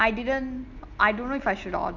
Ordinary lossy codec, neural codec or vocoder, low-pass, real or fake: none; none; 7.2 kHz; real